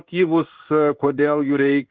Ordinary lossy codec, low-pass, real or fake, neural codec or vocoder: Opus, 32 kbps; 7.2 kHz; fake; codec, 16 kHz in and 24 kHz out, 1 kbps, XY-Tokenizer